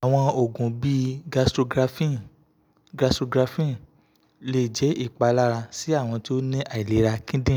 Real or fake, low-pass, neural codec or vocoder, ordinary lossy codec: real; none; none; none